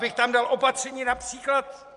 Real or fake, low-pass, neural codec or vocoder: fake; 10.8 kHz; vocoder, 24 kHz, 100 mel bands, Vocos